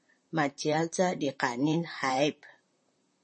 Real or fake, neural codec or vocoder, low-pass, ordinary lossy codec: fake; vocoder, 44.1 kHz, 128 mel bands, Pupu-Vocoder; 10.8 kHz; MP3, 32 kbps